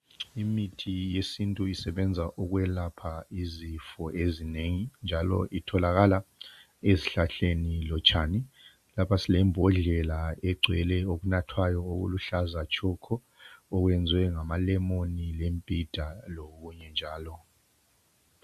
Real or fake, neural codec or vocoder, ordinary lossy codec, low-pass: real; none; AAC, 96 kbps; 14.4 kHz